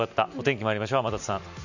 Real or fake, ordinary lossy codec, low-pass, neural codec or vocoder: real; none; 7.2 kHz; none